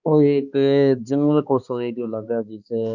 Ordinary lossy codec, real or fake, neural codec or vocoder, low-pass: none; fake; codec, 16 kHz, 2 kbps, X-Codec, HuBERT features, trained on balanced general audio; 7.2 kHz